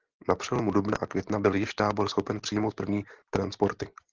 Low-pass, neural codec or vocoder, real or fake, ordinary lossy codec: 7.2 kHz; none; real; Opus, 16 kbps